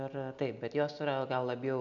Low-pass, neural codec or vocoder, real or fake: 7.2 kHz; none; real